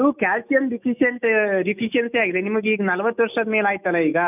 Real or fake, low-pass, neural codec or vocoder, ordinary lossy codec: fake; 3.6 kHz; autoencoder, 48 kHz, 128 numbers a frame, DAC-VAE, trained on Japanese speech; none